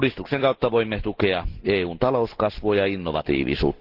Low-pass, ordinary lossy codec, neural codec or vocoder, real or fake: 5.4 kHz; Opus, 16 kbps; none; real